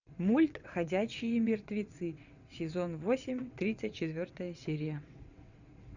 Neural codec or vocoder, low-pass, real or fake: vocoder, 22.05 kHz, 80 mel bands, WaveNeXt; 7.2 kHz; fake